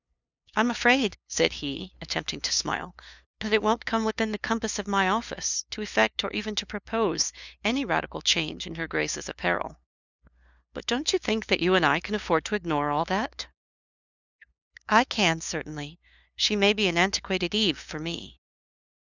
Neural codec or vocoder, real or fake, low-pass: codec, 16 kHz, 2 kbps, FunCodec, trained on LibriTTS, 25 frames a second; fake; 7.2 kHz